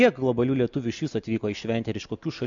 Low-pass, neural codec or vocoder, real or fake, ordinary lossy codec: 7.2 kHz; none; real; MP3, 64 kbps